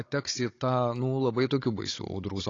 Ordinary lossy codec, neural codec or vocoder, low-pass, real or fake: AAC, 32 kbps; codec, 16 kHz, 16 kbps, FunCodec, trained on Chinese and English, 50 frames a second; 7.2 kHz; fake